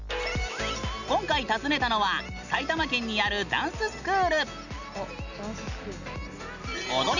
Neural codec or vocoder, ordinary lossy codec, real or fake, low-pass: none; none; real; 7.2 kHz